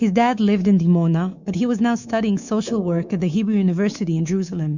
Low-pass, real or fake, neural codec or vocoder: 7.2 kHz; fake; codec, 24 kHz, 3.1 kbps, DualCodec